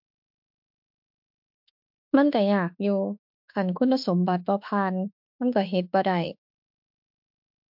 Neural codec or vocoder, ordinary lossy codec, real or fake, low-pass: autoencoder, 48 kHz, 32 numbers a frame, DAC-VAE, trained on Japanese speech; MP3, 48 kbps; fake; 5.4 kHz